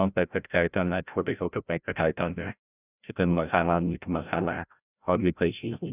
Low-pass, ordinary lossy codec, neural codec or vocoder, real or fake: 3.6 kHz; none; codec, 16 kHz, 0.5 kbps, FreqCodec, larger model; fake